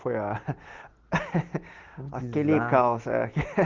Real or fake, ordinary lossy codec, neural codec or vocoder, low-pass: real; Opus, 16 kbps; none; 7.2 kHz